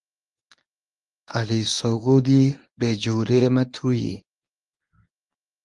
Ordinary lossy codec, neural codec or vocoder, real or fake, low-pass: Opus, 32 kbps; codec, 24 kHz, 0.9 kbps, WavTokenizer, medium speech release version 1; fake; 10.8 kHz